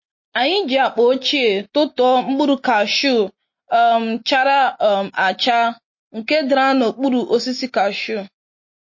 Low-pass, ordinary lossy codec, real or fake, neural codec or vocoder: 7.2 kHz; MP3, 32 kbps; real; none